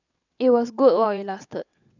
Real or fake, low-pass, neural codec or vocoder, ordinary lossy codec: fake; 7.2 kHz; vocoder, 22.05 kHz, 80 mel bands, WaveNeXt; none